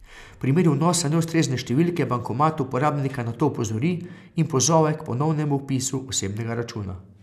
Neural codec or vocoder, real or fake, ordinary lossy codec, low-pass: none; real; none; 14.4 kHz